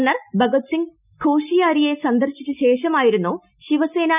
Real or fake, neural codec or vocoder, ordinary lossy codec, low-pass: real; none; none; 3.6 kHz